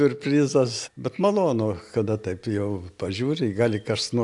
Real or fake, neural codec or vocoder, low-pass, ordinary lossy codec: real; none; 10.8 kHz; AAC, 64 kbps